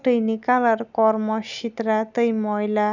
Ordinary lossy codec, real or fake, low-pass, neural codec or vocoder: none; real; 7.2 kHz; none